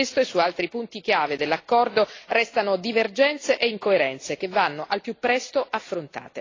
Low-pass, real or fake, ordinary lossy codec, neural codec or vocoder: 7.2 kHz; real; AAC, 32 kbps; none